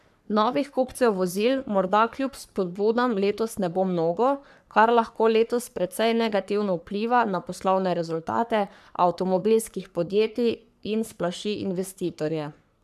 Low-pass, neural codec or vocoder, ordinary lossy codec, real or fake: 14.4 kHz; codec, 44.1 kHz, 3.4 kbps, Pupu-Codec; none; fake